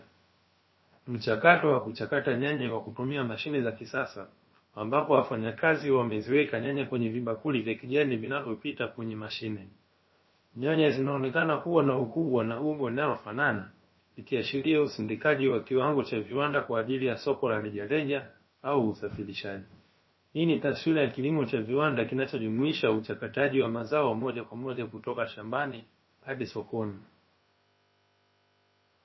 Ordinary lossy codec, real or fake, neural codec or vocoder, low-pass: MP3, 24 kbps; fake; codec, 16 kHz, about 1 kbps, DyCAST, with the encoder's durations; 7.2 kHz